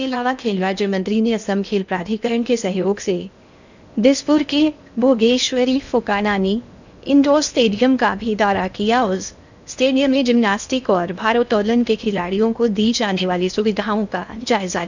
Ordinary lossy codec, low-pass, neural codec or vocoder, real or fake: none; 7.2 kHz; codec, 16 kHz in and 24 kHz out, 0.8 kbps, FocalCodec, streaming, 65536 codes; fake